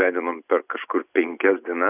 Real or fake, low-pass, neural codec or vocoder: real; 3.6 kHz; none